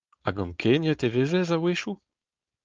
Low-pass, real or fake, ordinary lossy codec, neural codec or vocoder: 7.2 kHz; fake; Opus, 24 kbps; codec, 16 kHz, 4.8 kbps, FACodec